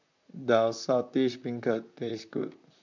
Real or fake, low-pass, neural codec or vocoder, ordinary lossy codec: fake; 7.2 kHz; vocoder, 44.1 kHz, 128 mel bands, Pupu-Vocoder; MP3, 64 kbps